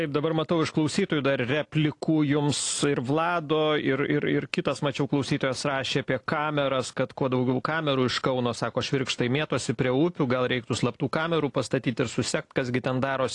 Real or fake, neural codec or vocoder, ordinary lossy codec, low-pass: real; none; AAC, 48 kbps; 10.8 kHz